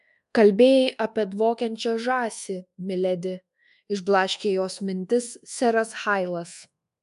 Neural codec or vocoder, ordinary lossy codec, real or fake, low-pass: codec, 24 kHz, 1.2 kbps, DualCodec; AAC, 96 kbps; fake; 10.8 kHz